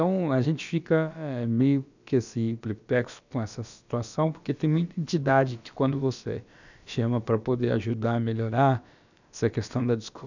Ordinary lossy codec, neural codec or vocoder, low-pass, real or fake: none; codec, 16 kHz, about 1 kbps, DyCAST, with the encoder's durations; 7.2 kHz; fake